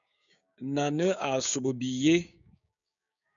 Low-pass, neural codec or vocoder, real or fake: 7.2 kHz; codec, 16 kHz, 6 kbps, DAC; fake